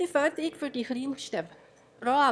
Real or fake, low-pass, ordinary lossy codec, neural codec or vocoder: fake; none; none; autoencoder, 22.05 kHz, a latent of 192 numbers a frame, VITS, trained on one speaker